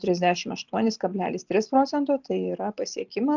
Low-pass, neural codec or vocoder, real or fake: 7.2 kHz; none; real